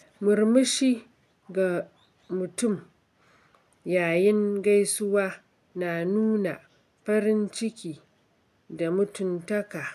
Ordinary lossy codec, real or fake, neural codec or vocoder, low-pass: none; real; none; 14.4 kHz